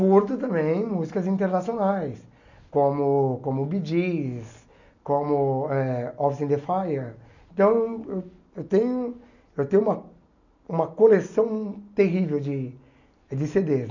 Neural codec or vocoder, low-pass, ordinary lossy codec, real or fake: none; 7.2 kHz; none; real